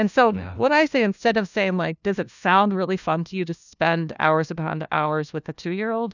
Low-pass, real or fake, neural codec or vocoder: 7.2 kHz; fake; codec, 16 kHz, 1 kbps, FunCodec, trained on LibriTTS, 50 frames a second